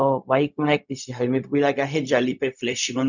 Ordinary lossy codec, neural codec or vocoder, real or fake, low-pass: none; codec, 16 kHz, 0.4 kbps, LongCat-Audio-Codec; fake; 7.2 kHz